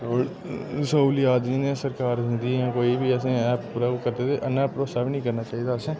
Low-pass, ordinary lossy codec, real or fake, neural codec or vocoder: none; none; real; none